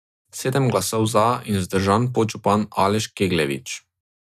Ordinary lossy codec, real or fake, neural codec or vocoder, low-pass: none; real; none; 14.4 kHz